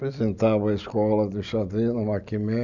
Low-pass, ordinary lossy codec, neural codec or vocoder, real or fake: 7.2 kHz; none; none; real